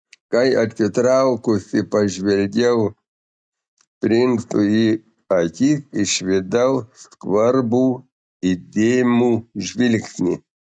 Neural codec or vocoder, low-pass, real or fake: none; 9.9 kHz; real